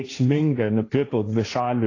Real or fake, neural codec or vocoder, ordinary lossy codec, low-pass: fake; codec, 16 kHz, 1.1 kbps, Voila-Tokenizer; AAC, 32 kbps; 7.2 kHz